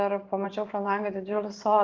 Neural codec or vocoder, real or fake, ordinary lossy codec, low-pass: vocoder, 44.1 kHz, 128 mel bands every 512 samples, BigVGAN v2; fake; Opus, 32 kbps; 7.2 kHz